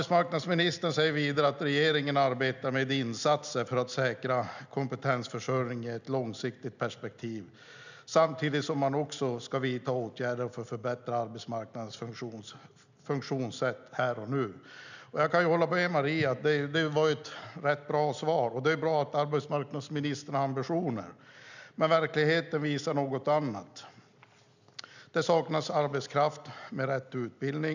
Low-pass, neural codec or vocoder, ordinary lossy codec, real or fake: 7.2 kHz; none; none; real